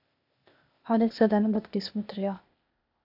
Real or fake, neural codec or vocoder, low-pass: fake; codec, 16 kHz, 0.8 kbps, ZipCodec; 5.4 kHz